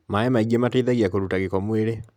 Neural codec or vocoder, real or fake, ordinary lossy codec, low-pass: none; real; none; 19.8 kHz